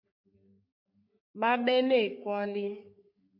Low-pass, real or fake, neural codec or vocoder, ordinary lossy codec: 5.4 kHz; fake; codec, 44.1 kHz, 3.4 kbps, Pupu-Codec; MP3, 32 kbps